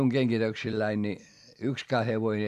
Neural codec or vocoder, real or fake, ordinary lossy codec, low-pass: vocoder, 44.1 kHz, 128 mel bands every 256 samples, BigVGAN v2; fake; AAC, 96 kbps; 14.4 kHz